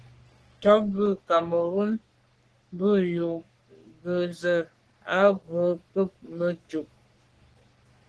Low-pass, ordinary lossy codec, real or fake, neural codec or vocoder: 10.8 kHz; Opus, 16 kbps; fake; codec, 44.1 kHz, 3.4 kbps, Pupu-Codec